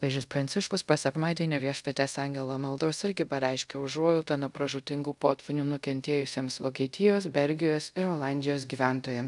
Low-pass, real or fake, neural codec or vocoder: 10.8 kHz; fake; codec, 24 kHz, 0.5 kbps, DualCodec